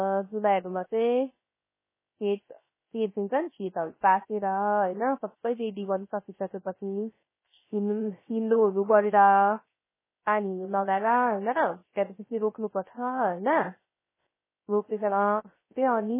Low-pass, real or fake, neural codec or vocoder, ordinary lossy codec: 3.6 kHz; fake; codec, 16 kHz, 0.3 kbps, FocalCodec; MP3, 16 kbps